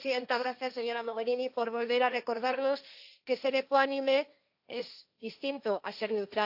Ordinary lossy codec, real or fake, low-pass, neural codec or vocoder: AAC, 48 kbps; fake; 5.4 kHz; codec, 16 kHz, 1.1 kbps, Voila-Tokenizer